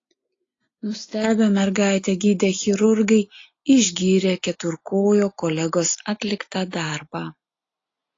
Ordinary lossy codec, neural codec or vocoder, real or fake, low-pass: AAC, 32 kbps; none; real; 7.2 kHz